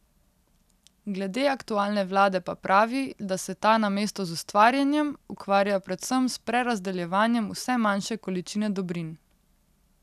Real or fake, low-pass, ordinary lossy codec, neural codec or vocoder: real; 14.4 kHz; none; none